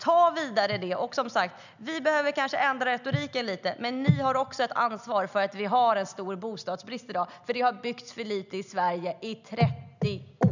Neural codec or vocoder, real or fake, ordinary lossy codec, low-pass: none; real; none; 7.2 kHz